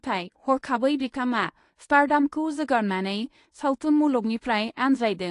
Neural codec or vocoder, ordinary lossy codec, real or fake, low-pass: codec, 24 kHz, 0.9 kbps, WavTokenizer, medium speech release version 1; AAC, 48 kbps; fake; 10.8 kHz